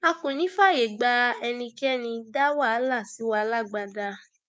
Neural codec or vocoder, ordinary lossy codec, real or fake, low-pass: codec, 16 kHz, 6 kbps, DAC; none; fake; none